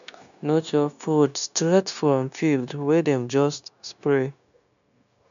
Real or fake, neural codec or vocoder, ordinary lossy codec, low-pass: fake; codec, 16 kHz, 0.9 kbps, LongCat-Audio-Codec; MP3, 96 kbps; 7.2 kHz